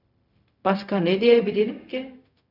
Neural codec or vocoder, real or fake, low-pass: codec, 16 kHz, 0.4 kbps, LongCat-Audio-Codec; fake; 5.4 kHz